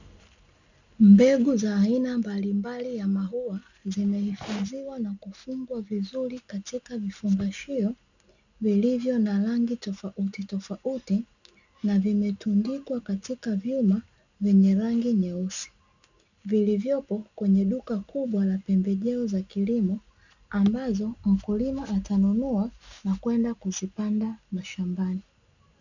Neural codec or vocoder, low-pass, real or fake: none; 7.2 kHz; real